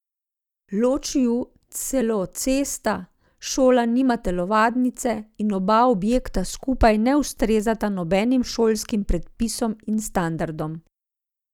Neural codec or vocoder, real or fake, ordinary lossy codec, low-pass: none; real; none; 19.8 kHz